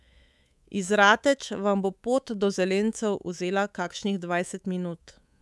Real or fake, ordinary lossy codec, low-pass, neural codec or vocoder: fake; none; none; codec, 24 kHz, 3.1 kbps, DualCodec